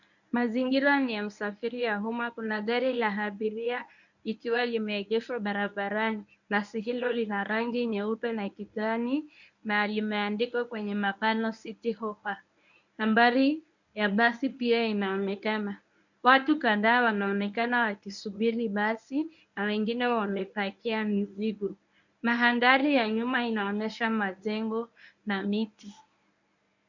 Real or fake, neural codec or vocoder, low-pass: fake; codec, 24 kHz, 0.9 kbps, WavTokenizer, medium speech release version 1; 7.2 kHz